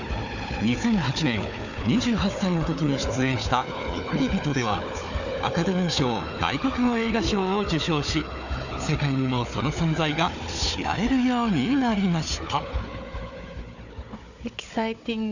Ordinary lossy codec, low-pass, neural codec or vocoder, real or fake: none; 7.2 kHz; codec, 16 kHz, 4 kbps, FunCodec, trained on Chinese and English, 50 frames a second; fake